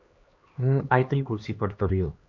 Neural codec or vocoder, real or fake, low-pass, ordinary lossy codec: codec, 16 kHz, 2 kbps, X-Codec, HuBERT features, trained on LibriSpeech; fake; 7.2 kHz; MP3, 48 kbps